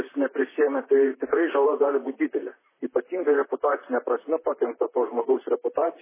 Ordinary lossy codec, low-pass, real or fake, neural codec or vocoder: MP3, 16 kbps; 3.6 kHz; fake; vocoder, 44.1 kHz, 128 mel bands, Pupu-Vocoder